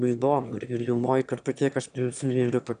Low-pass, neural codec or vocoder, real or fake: 9.9 kHz; autoencoder, 22.05 kHz, a latent of 192 numbers a frame, VITS, trained on one speaker; fake